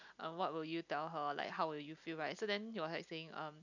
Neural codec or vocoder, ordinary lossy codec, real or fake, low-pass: none; none; real; 7.2 kHz